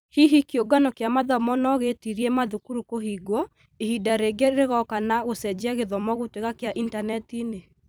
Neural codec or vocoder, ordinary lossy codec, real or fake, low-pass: vocoder, 44.1 kHz, 128 mel bands every 256 samples, BigVGAN v2; none; fake; none